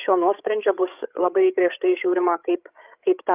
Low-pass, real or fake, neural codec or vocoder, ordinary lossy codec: 3.6 kHz; fake; codec, 16 kHz, 8 kbps, FreqCodec, larger model; Opus, 24 kbps